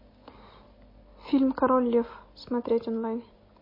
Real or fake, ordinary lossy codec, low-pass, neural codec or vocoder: real; MP3, 24 kbps; 5.4 kHz; none